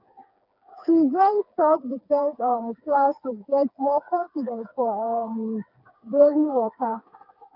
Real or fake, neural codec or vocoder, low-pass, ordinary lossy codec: fake; codec, 16 kHz, 4 kbps, FreqCodec, smaller model; 5.4 kHz; none